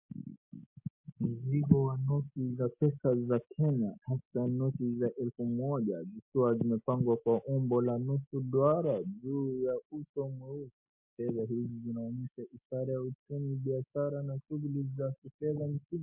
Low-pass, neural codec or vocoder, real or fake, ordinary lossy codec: 3.6 kHz; none; real; MP3, 32 kbps